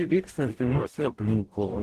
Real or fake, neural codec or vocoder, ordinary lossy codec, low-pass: fake; codec, 44.1 kHz, 0.9 kbps, DAC; Opus, 16 kbps; 14.4 kHz